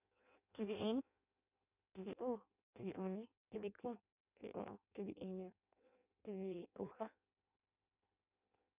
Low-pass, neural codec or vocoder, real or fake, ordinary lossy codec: 3.6 kHz; codec, 16 kHz in and 24 kHz out, 0.6 kbps, FireRedTTS-2 codec; fake; none